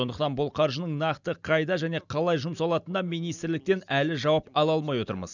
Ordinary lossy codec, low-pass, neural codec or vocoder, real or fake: none; 7.2 kHz; none; real